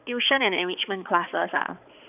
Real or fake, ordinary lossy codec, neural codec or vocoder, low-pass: fake; none; codec, 16 kHz, 4 kbps, X-Codec, HuBERT features, trained on balanced general audio; 3.6 kHz